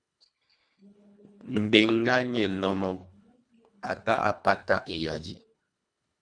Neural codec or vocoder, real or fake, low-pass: codec, 24 kHz, 1.5 kbps, HILCodec; fake; 9.9 kHz